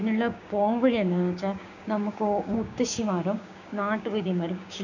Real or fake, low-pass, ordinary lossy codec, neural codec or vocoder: fake; 7.2 kHz; none; codec, 16 kHz, 6 kbps, DAC